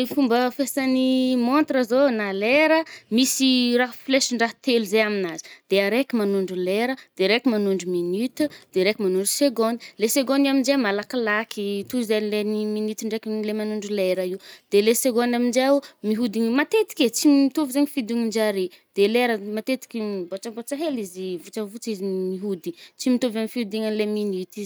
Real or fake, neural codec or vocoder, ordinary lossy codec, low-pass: real; none; none; none